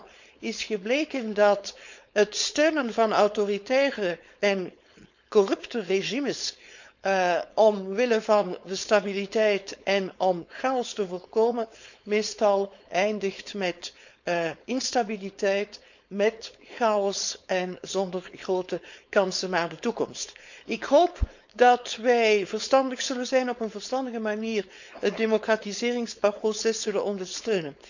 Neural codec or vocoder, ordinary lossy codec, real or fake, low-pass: codec, 16 kHz, 4.8 kbps, FACodec; none; fake; 7.2 kHz